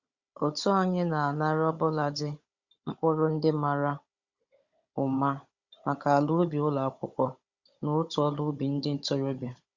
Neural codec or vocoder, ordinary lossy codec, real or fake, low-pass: codec, 16 kHz, 16 kbps, FunCodec, trained on Chinese and English, 50 frames a second; Opus, 64 kbps; fake; 7.2 kHz